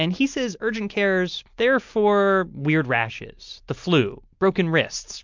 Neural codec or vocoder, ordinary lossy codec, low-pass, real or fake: none; MP3, 64 kbps; 7.2 kHz; real